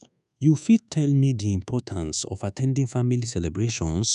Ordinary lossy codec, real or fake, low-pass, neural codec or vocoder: none; fake; 10.8 kHz; codec, 24 kHz, 1.2 kbps, DualCodec